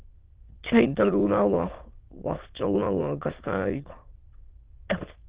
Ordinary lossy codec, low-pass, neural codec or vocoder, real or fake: Opus, 16 kbps; 3.6 kHz; autoencoder, 22.05 kHz, a latent of 192 numbers a frame, VITS, trained on many speakers; fake